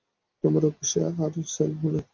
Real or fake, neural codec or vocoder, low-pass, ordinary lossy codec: real; none; 7.2 kHz; Opus, 24 kbps